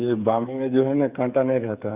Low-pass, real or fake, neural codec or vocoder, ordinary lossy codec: 3.6 kHz; fake; codec, 16 kHz, 8 kbps, FreqCodec, smaller model; Opus, 64 kbps